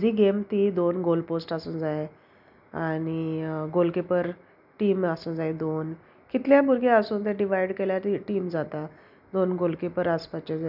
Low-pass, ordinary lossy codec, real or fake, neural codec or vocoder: 5.4 kHz; none; real; none